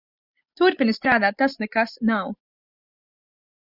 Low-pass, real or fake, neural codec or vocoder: 5.4 kHz; real; none